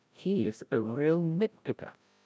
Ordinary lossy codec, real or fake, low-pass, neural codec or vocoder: none; fake; none; codec, 16 kHz, 0.5 kbps, FreqCodec, larger model